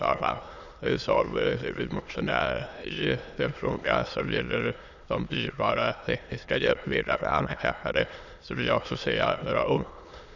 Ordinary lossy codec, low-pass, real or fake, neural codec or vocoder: none; 7.2 kHz; fake; autoencoder, 22.05 kHz, a latent of 192 numbers a frame, VITS, trained on many speakers